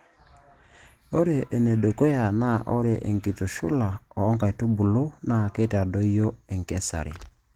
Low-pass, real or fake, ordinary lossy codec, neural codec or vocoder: 19.8 kHz; real; Opus, 16 kbps; none